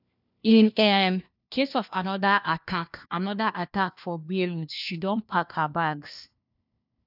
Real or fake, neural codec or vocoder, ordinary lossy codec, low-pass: fake; codec, 16 kHz, 1 kbps, FunCodec, trained on LibriTTS, 50 frames a second; none; 5.4 kHz